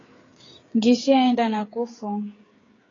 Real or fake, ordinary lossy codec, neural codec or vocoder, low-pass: fake; AAC, 32 kbps; codec, 16 kHz, 8 kbps, FreqCodec, smaller model; 7.2 kHz